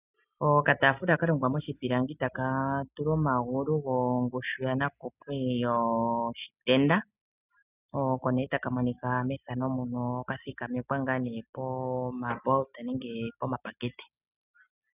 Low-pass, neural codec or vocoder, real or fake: 3.6 kHz; none; real